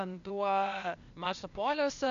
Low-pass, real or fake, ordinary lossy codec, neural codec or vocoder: 7.2 kHz; fake; MP3, 64 kbps; codec, 16 kHz, 0.8 kbps, ZipCodec